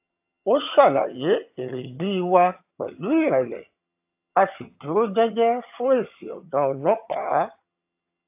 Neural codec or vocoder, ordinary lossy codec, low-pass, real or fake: vocoder, 22.05 kHz, 80 mel bands, HiFi-GAN; AAC, 32 kbps; 3.6 kHz; fake